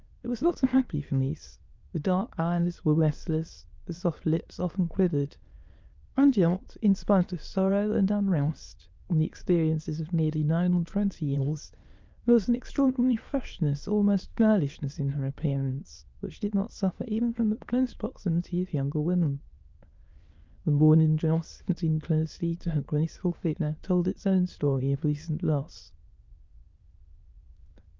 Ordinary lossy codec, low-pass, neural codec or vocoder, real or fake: Opus, 24 kbps; 7.2 kHz; autoencoder, 22.05 kHz, a latent of 192 numbers a frame, VITS, trained on many speakers; fake